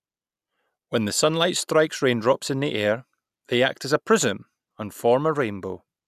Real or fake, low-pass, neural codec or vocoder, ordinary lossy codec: real; 14.4 kHz; none; none